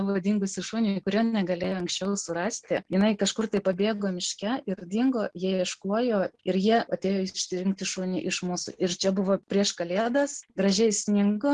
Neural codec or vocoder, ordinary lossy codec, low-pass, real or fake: none; Opus, 16 kbps; 10.8 kHz; real